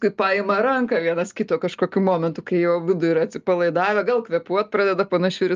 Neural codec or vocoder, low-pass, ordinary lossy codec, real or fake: none; 7.2 kHz; Opus, 24 kbps; real